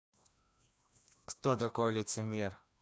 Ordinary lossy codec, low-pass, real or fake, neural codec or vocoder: none; none; fake; codec, 16 kHz, 1 kbps, FreqCodec, larger model